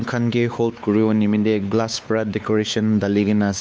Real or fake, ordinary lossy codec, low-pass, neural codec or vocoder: fake; none; none; codec, 16 kHz, 4 kbps, X-Codec, WavLM features, trained on Multilingual LibriSpeech